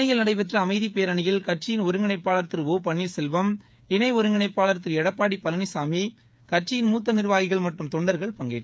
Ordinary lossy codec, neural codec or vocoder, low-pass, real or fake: none; codec, 16 kHz, 8 kbps, FreqCodec, smaller model; none; fake